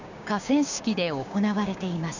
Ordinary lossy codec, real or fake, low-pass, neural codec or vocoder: none; fake; 7.2 kHz; codec, 16 kHz, 6 kbps, DAC